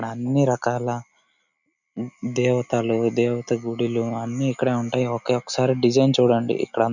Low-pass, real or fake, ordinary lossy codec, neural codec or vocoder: 7.2 kHz; real; none; none